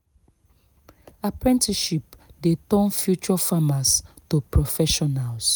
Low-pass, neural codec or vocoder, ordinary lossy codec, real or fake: none; none; none; real